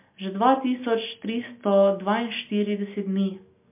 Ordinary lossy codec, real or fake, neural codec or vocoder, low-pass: MP3, 32 kbps; real; none; 3.6 kHz